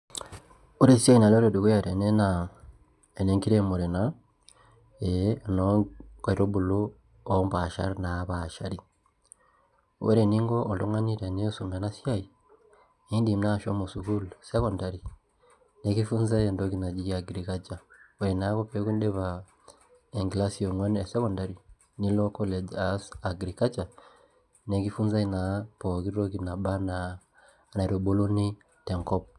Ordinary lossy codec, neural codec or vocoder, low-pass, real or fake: none; none; none; real